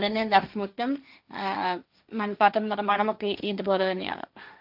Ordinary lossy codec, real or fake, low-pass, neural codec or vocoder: none; fake; 5.4 kHz; codec, 16 kHz, 1.1 kbps, Voila-Tokenizer